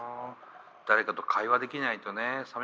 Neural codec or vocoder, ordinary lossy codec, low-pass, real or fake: none; none; none; real